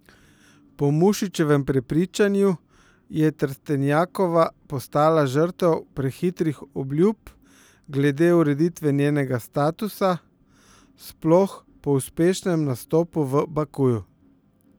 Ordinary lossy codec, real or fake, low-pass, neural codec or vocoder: none; real; none; none